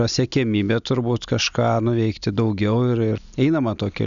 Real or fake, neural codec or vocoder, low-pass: real; none; 7.2 kHz